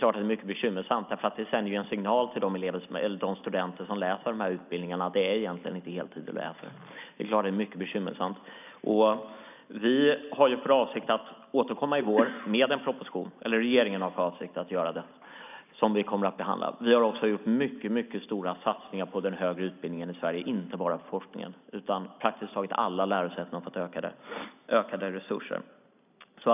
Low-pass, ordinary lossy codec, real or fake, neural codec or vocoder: 3.6 kHz; none; real; none